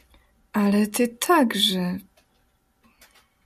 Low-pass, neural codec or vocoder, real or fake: 14.4 kHz; none; real